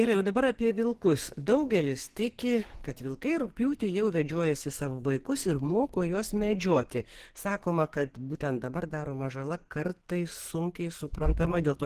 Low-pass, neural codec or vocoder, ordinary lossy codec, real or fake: 14.4 kHz; codec, 44.1 kHz, 2.6 kbps, SNAC; Opus, 16 kbps; fake